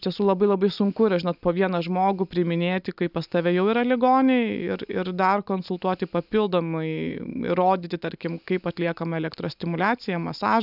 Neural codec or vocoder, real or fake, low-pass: none; real; 5.4 kHz